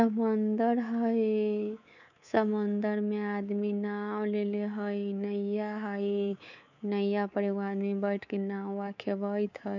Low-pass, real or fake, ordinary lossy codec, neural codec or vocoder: 7.2 kHz; fake; none; codec, 24 kHz, 3.1 kbps, DualCodec